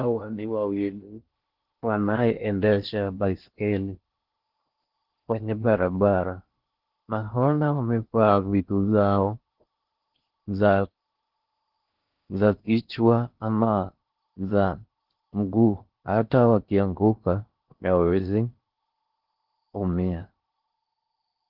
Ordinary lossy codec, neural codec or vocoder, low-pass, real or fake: Opus, 16 kbps; codec, 16 kHz in and 24 kHz out, 0.6 kbps, FocalCodec, streaming, 2048 codes; 5.4 kHz; fake